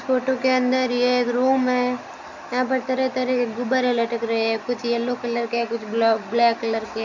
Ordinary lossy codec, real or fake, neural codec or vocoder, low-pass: none; real; none; 7.2 kHz